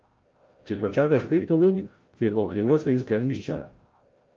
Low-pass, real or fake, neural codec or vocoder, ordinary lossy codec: 7.2 kHz; fake; codec, 16 kHz, 0.5 kbps, FreqCodec, larger model; Opus, 32 kbps